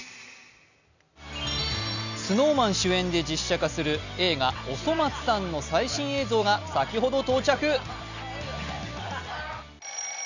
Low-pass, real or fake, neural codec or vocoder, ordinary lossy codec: 7.2 kHz; real; none; none